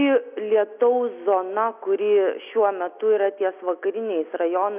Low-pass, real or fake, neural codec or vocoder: 3.6 kHz; real; none